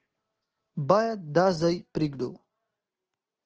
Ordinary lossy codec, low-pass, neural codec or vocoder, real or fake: Opus, 16 kbps; 7.2 kHz; none; real